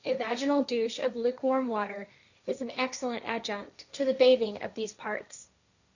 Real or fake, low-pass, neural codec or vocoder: fake; 7.2 kHz; codec, 16 kHz, 1.1 kbps, Voila-Tokenizer